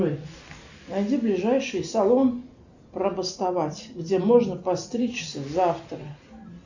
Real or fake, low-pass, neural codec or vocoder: real; 7.2 kHz; none